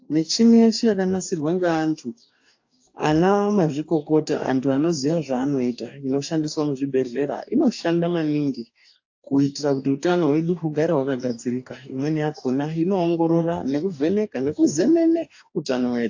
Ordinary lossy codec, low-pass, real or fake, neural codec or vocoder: AAC, 48 kbps; 7.2 kHz; fake; codec, 44.1 kHz, 2.6 kbps, DAC